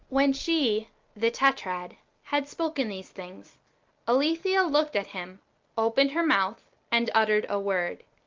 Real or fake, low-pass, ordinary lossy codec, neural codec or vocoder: real; 7.2 kHz; Opus, 24 kbps; none